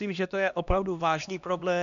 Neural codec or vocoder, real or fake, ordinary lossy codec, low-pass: codec, 16 kHz, 1 kbps, X-Codec, HuBERT features, trained on LibriSpeech; fake; MP3, 64 kbps; 7.2 kHz